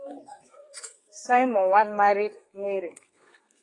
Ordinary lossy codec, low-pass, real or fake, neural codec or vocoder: AAC, 32 kbps; 10.8 kHz; fake; codec, 32 kHz, 1.9 kbps, SNAC